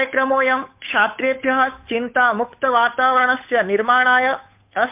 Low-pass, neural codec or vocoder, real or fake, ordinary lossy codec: 3.6 kHz; codec, 16 kHz, 8 kbps, FunCodec, trained on Chinese and English, 25 frames a second; fake; MP3, 32 kbps